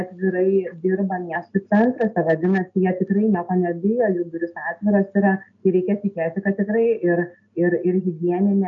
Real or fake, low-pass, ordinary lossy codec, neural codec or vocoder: real; 7.2 kHz; MP3, 64 kbps; none